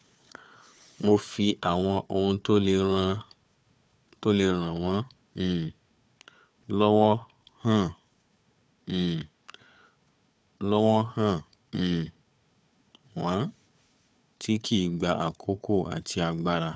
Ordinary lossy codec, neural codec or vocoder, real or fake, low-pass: none; codec, 16 kHz, 4 kbps, FunCodec, trained on Chinese and English, 50 frames a second; fake; none